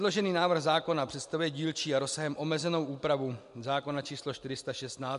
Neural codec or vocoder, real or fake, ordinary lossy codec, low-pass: none; real; MP3, 64 kbps; 10.8 kHz